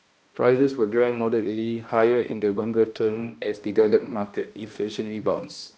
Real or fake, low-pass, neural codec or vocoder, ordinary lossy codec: fake; none; codec, 16 kHz, 1 kbps, X-Codec, HuBERT features, trained on balanced general audio; none